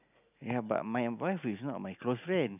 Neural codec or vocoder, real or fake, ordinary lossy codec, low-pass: none; real; none; 3.6 kHz